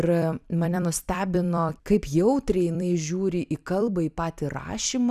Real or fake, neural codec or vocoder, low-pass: fake; vocoder, 44.1 kHz, 128 mel bands every 256 samples, BigVGAN v2; 14.4 kHz